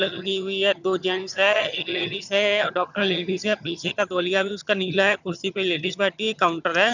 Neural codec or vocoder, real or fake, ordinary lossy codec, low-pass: vocoder, 22.05 kHz, 80 mel bands, HiFi-GAN; fake; none; 7.2 kHz